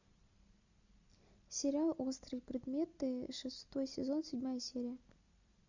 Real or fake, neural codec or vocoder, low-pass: real; none; 7.2 kHz